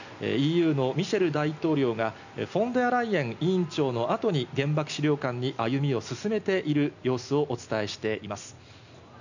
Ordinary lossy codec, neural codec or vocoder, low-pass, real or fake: none; none; 7.2 kHz; real